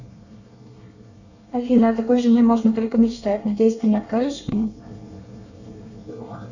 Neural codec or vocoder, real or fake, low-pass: codec, 24 kHz, 1 kbps, SNAC; fake; 7.2 kHz